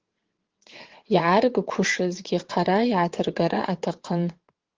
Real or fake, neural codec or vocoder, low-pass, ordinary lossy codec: fake; vocoder, 22.05 kHz, 80 mel bands, WaveNeXt; 7.2 kHz; Opus, 16 kbps